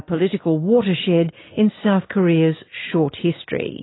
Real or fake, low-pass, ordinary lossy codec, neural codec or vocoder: real; 7.2 kHz; AAC, 16 kbps; none